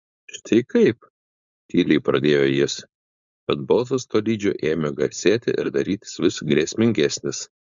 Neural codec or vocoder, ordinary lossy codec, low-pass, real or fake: codec, 16 kHz, 4.8 kbps, FACodec; Opus, 64 kbps; 7.2 kHz; fake